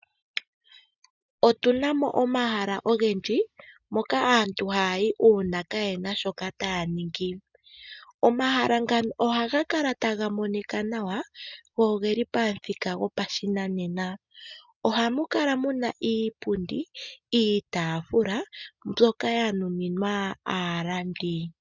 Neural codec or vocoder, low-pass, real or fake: none; 7.2 kHz; real